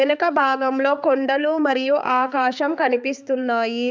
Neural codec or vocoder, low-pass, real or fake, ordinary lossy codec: codec, 16 kHz, 4 kbps, X-Codec, HuBERT features, trained on balanced general audio; none; fake; none